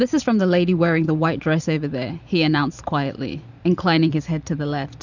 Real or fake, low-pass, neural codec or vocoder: fake; 7.2 kHz; vocoder, 44.1 kHz, 128 mel bands every 512 samples, BigVGAN v2